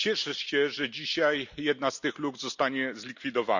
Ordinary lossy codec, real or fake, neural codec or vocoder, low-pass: none; real; none; 7.2 kHz